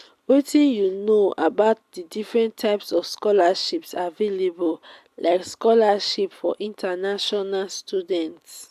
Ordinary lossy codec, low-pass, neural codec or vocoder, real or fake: AAC, 96 kbps; 14.4 kHz; none; real